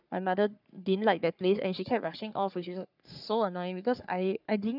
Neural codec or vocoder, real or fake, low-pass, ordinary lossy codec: codec, 44.1 kHz, 3.4 kbps, Pupu-Codec; fake; 5.4 kHz; none